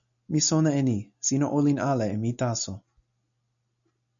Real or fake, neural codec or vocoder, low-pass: real; none; 7.2 kHz